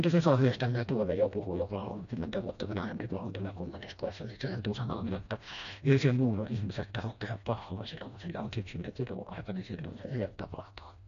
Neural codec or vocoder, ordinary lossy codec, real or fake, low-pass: codec, 16 kHz, 1 kbps, FreqCodec, smaller model; none; fake; 7.2 kHz